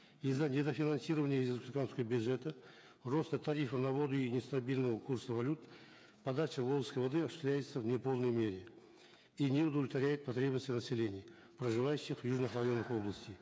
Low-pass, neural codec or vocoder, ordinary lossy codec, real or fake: none; codec, 16 kHz, 8 kbps, FreqCodec, smaller model; none; fake